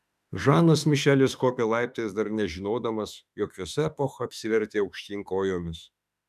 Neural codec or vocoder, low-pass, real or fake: autoencoder, 48 kHz, 32 numbers a frame, DAC-VAE, trained on Japanese speech; 14.4 kHz; fake